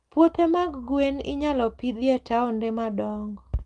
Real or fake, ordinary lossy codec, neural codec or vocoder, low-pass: real; Opus, 24 kbps; none; 10.8 kHz